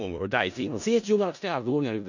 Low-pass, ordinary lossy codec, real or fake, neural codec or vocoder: 7.2 kHz; none; fake; codec, 16 kHz in and 24 kHz out, 0.4 kbps, LongCat-Audio-Codec, four codebook decoder